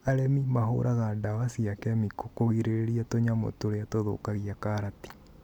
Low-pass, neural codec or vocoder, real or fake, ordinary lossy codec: 19.8 kHz; none; real; none